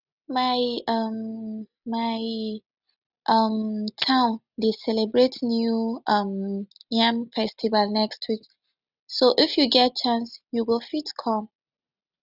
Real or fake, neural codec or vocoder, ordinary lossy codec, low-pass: real; none; none; 5.4 kHz